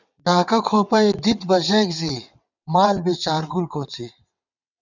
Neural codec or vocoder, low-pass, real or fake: vocoder, 22.05 kHz, 80 mel bands, WaveNeXt; 7.2 kHz; fake